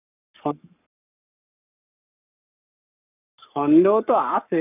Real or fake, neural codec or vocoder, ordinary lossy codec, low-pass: fake; codec, 44.1 kHz, 7.8 kbps, Pupu-Codec; none; 3.6 kHz